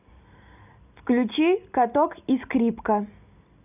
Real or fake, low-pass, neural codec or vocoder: real; 3.6 kHz; none